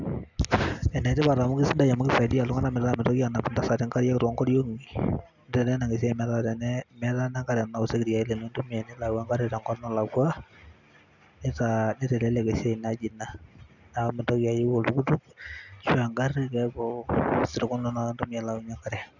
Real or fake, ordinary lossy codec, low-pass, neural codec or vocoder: real; none; 7.2 kHz; none